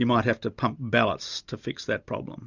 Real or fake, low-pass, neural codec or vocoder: real; 7.2 kHz; none